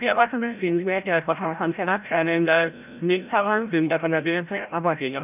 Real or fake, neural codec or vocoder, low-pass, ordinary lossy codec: fake; codec, 16 kHz, 0.5 kbps, FreqCodec, larger model; 3.6 kHz; none